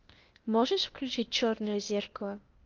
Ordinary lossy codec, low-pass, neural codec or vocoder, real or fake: Opus, 32 kbps; 7.2 kHz; codec, 16 kHz, 0.8 kbps, ZipCodec; fake